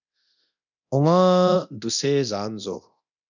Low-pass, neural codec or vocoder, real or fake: 7.2 kHz; codec, 24 kHz, 0.9 kbps, DualCodec; fake